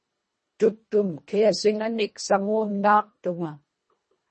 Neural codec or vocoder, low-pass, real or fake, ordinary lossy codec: codec, 24 kHz, 1.5 kbps, HILCodec; 10.8 kHz; fake; MP3, 32 kbps